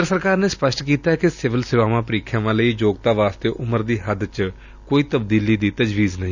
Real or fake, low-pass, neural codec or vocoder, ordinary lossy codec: real; 7.2 kHz; none; none